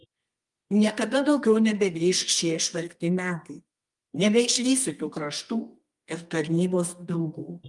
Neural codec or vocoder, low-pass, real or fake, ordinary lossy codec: codec, 24 kHz, 0.9 kbps, WavTokenizer, medium music audio release; 10.8 kHz; fake; Opus, 32 kbps